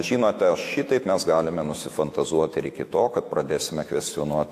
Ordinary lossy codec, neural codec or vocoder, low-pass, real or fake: AAC, 64 kbps; autoencoder, 48 kHz, 128 numbers a frame, DAC-VAE, trained on Japanese speech; 14.4 kHz; fake